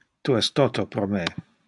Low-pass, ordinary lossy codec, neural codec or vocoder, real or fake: 10.8 kHz; AAC, 64 kbps; none; real